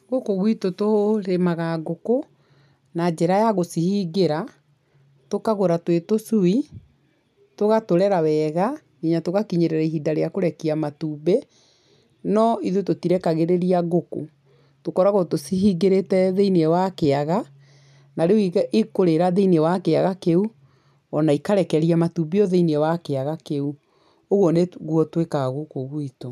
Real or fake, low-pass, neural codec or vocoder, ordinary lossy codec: real; 14.4 kHz; none; none